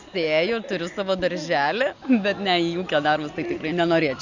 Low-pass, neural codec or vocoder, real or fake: 7.2 kHz; none; real